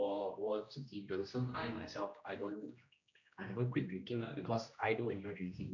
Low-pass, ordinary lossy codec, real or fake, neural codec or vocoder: 7.2 kHz; none; fake; codec, 16 kHz, 1 kbps, X-Codec, HuBERT features, trained on general audio